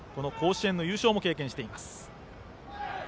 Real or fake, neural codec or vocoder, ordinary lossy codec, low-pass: real; none; none; none